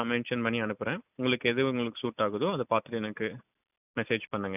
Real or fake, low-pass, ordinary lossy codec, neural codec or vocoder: real; 3.6 kHz; none; none